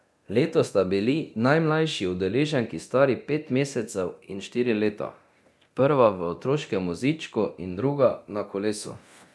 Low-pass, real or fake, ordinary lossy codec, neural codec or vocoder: none; fake; none; codec, 24 kHz, 0.9 kbps, DualCodec